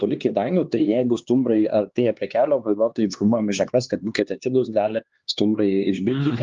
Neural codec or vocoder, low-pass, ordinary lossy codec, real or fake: codec, 16 kHz, 2 kbps, X-Codec, HuBERT features, trained on LibriSpeech; 7.2 kHz; Opus, 32 kbps; fake